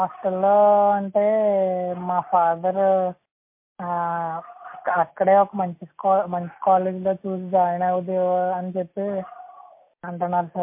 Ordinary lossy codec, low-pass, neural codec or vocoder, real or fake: MP3, 24 kbps; 3.6 kHz; none; real